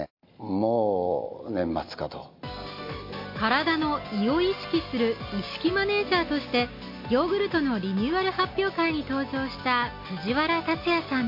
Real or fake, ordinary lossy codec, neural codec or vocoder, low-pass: real; MP3, 32 kbps; none; 5.4 kHz